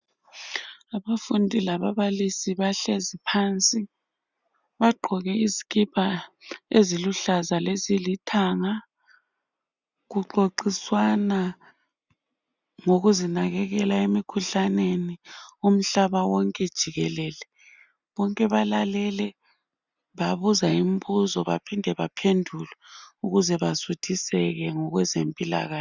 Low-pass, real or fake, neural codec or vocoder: 7.2 kHz; real; none